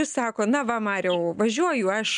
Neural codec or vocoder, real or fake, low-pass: none; real; 9.9 kHz